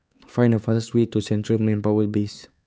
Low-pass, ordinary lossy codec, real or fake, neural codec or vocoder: none; none; fake; codec, 16 kHz, 4 kbps, X-Codec, HuBERT features, trained on LibriSpeech